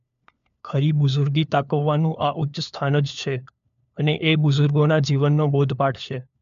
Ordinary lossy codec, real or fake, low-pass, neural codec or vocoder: MP3, 64 kbps; fake; 7.2 kHz; codec, 16 kHz, 4 kbps, FunCodec, trained on LibriTTS, 50 frames a second